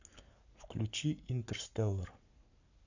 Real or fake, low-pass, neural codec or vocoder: fake; 7.2 kHz; codec, 44.1 kHz, 7.8 kbps, Pupu-Codec